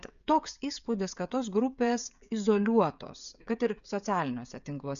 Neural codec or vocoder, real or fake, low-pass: codec, 16 kHz, 16 kbps, FreqCodec, smaller model; fake; 7.2 kHz